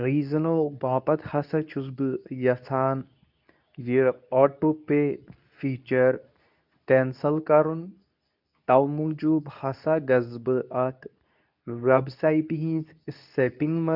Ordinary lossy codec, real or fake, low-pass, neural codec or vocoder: none; fake; 5.4 kHz; codec, 24 kHz, 0.9 kbps, WavTokenizer, medium speech release version 2